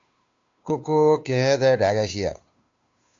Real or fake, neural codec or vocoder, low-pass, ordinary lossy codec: fake; codec, 16 kHz, 2 kbps, FunCodec, trained on Chinese and English, 25 frames a second; 7.2 kHz; AAC, 64 kbps